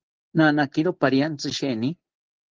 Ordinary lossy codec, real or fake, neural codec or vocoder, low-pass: Opus, 16 kbps; real; none; 7.2 kHz